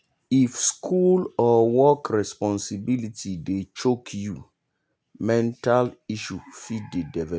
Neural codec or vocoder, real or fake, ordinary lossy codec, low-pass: none; real; none; none